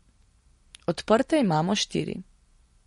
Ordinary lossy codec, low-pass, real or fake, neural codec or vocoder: MP3, 48 kbps; 19.8 kHz; real; none